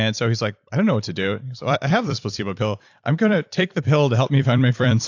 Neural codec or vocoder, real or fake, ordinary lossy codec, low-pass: vocoder, 44.1 kHz, 128 mel bands every 256 samples, BigVGAN v2; fake; AAC, 48 kbps; 7.2 kHz